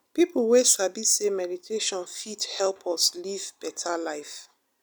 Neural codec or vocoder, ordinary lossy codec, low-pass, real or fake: none; none; none; real